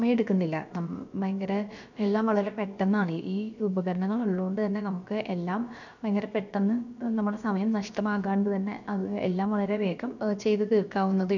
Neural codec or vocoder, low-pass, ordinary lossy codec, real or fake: codec, 16 kHz, about 1 kbps, DyCAST, with the encoder's durations; 7.2 kHz; none; fake